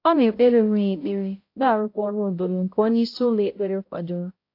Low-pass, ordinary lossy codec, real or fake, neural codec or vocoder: 5.4 kHz; AAC, 32 kbps; fake; codec, 16 kHz, 0.5 kbps, X-Codec, HuBERT features, trained on balanced general audio